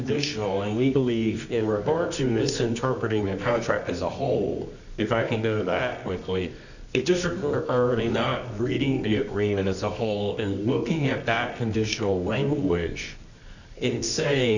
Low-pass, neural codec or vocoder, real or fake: 7.2 kHz; codec, 24 kHz, 0.9 kbps, WavTokenizer, medium music audio release; fake